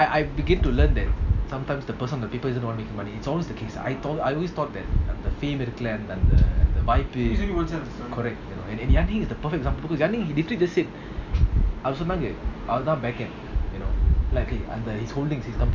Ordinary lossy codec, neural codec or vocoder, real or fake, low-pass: none; none; real; 7.2 kHz